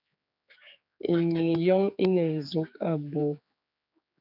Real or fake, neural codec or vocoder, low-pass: fake; codec, 16 kHz, 4 kbps, X-Codec, HuBERT features, trained on general audio; 5.4 kHz